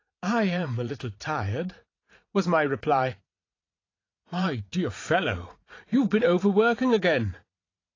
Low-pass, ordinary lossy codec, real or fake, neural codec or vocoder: 7.2 kHz; AAC, 32 kbps; fake; vocoder, 44.1 kHz, 80 mel bands, Vocos